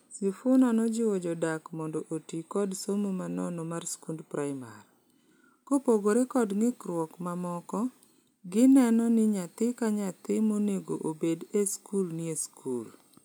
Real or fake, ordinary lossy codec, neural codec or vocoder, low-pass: real; none; none; none